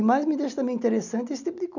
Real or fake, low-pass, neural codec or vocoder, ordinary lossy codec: real; 7.2 kHz; none; none